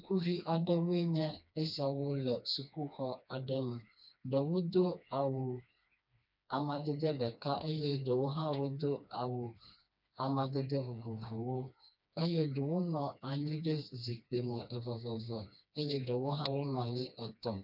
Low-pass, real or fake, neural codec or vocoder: 5.4 kHz; fake; codec, 16 kHz, 2 kbps, FreqCodec, smaller model